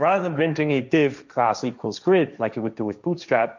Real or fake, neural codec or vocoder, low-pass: fake; codec, 16 kHz, 1.1 kbps, Voila-Tokenizer; 7.2 kHz